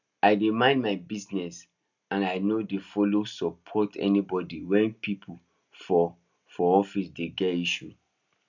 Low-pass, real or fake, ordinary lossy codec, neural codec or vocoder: 7.2 kHz; real; none; none